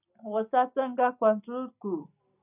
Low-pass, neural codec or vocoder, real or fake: 3.6 kHz; none; real